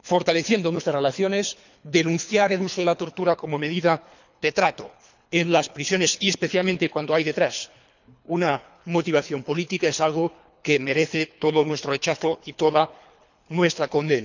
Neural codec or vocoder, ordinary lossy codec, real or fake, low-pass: codec, 24 kHz, 3 kbps, HILCodec; none; fake; 7.2 kHz